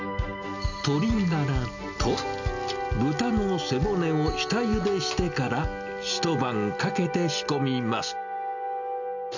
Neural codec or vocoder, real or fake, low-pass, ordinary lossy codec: none; real; 7.2 kHz; none